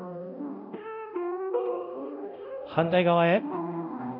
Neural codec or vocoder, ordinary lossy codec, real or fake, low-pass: codec, 24 kHz, 0.9 kbps, DualCodec; none; fake; 5.4 kHz